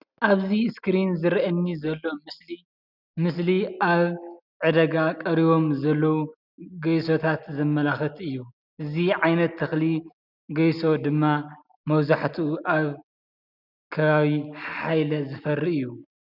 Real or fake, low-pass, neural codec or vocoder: real; 5.4 kHz; none